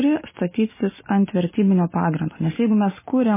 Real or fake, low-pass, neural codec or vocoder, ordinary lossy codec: real; 3.6 kHz; none; MP3, 16 kbps